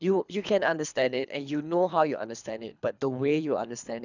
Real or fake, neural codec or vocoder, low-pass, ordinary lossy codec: fake; codec, 24 kHz, 3 kbps, HILCodec; 7.2 kHz; none